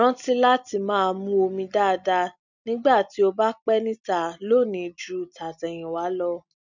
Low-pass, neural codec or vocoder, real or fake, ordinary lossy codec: 7.2 kHz; none; real; none